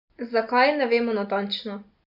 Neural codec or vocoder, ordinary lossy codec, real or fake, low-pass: none; none; real; 5.4 kHz